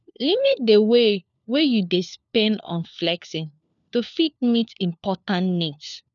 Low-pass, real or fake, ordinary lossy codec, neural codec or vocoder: 7.2 kHz; fake; none; codec, 16 kHz, 4 kbps, FunCodec, trained on LibriTTS, 50 frames a second